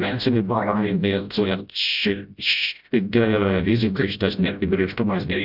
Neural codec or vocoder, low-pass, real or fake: codec, 16 kHz, 0.5 kbps, FreqCodec, smaller model; 5.4 kHz; fake